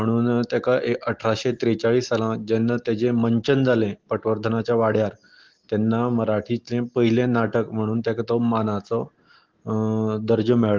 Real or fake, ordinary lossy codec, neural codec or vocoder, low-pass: real; Opus, 16 kbps; none; 7.2 kHz